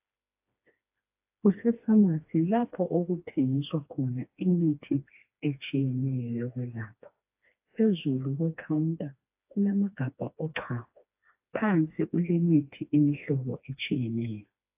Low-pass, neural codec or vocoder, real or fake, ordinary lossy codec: 3.6 kHz; codec, 16 kHz, 2 kbps, FreqCodec, smaller model; fake; AAC, 32 kbps